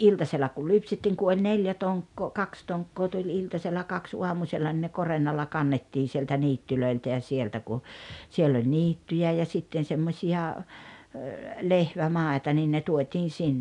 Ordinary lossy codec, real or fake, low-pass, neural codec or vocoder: none; real; 10.8 kHz; none